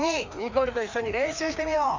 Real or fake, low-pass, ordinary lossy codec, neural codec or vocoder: fake; 7.2 kHz; MP3, 64 kbps; codec, 16 kHz, 2 kbps, FreqCodec, larger model